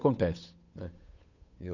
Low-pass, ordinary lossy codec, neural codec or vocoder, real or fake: 7.2 kHz; none; codec, 16 kHz, 8 kbps, FunCodec, trained on Chinese and English, 25 frames a second; fake